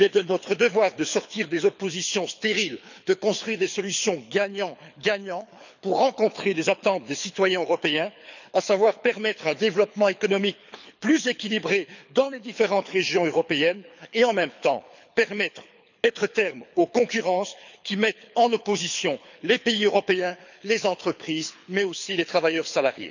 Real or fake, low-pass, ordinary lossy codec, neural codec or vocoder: fake; 7.2 kHz; none; codec, 24 kHz, 6 kbps, HILCodec